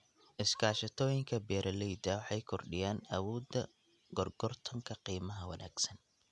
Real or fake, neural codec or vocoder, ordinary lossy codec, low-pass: real; none; none; none